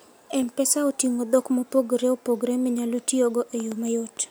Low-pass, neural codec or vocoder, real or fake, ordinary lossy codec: none; none; real; none